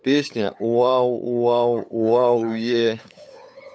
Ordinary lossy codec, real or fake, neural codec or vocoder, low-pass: none; fake; codec, 16 kHz, 8 kbps, FunCodec, trained on LibriTTS, 25 frames a second; none